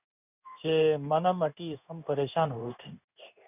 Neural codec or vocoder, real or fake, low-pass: codec, 16 kHz in and 24 kHz out, 1 kbps, XY-Tokenizer; fake; 3.6 kHz